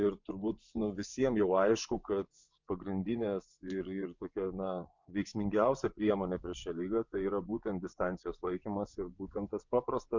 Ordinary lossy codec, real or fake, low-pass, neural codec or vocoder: MP3, 64 kbps; fake; 7.2 kHz; vocoder, 24 kHz, 100 mel bands, Vocos